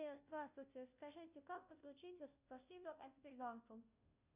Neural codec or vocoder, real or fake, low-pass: codec, 16 kHz, 0.5 kbps, FunCodec, trained on Chinese and English, 25 frames a second; fake; 3.6 kHz